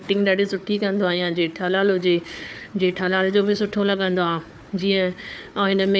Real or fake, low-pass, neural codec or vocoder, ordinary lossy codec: fake; none; codec, 16 kHz, 4 kbps, FunCodec, trained on Chinese and English, 50 frames a second; none